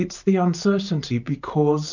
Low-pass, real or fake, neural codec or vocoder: 7.2 kHz; real; none